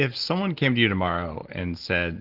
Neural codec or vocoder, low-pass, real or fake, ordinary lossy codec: none; 5.4 kHz; real; Opus, 16 kbps